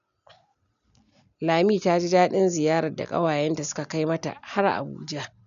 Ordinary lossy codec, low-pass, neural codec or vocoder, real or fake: none; 7.2 kHz; none; real